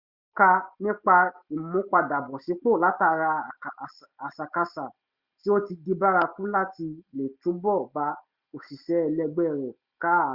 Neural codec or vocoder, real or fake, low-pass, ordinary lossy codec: none; real; 5.4 kHz; Opus, 64 kbps